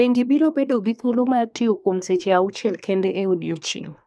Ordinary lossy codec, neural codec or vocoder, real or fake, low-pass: none; codec, 24 kHz, 1 kbps, SNAC; fake; none